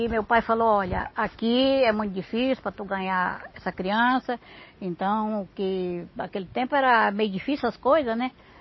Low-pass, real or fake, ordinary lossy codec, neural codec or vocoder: 7.2 kHz; real; MP3, 24 kbps; none